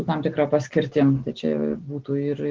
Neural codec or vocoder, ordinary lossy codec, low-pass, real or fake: none; Opus, 16 kbps; 7.2 kHz; real